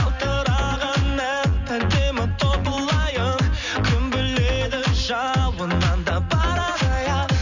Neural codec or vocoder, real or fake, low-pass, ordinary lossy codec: none; real; 7.2 kHz; none